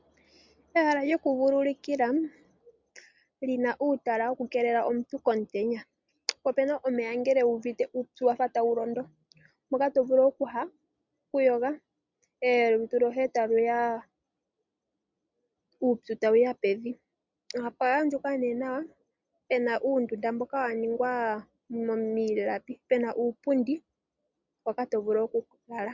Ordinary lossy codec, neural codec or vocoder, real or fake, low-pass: MP3, 64 kbps; none; real; 7.2 kHz